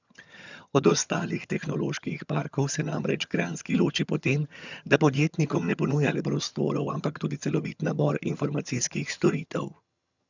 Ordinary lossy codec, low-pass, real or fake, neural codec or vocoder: none; 7.2 kHz; fake; vocoder, 22.05 kHz, 80 mel bands, HiFi-GAN